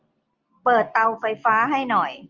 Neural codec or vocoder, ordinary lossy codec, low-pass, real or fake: none; Opus, 24 kbps; 7.2 kHz; real